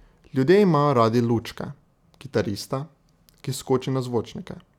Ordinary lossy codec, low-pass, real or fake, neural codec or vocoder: none; 19.8 kHz; real; none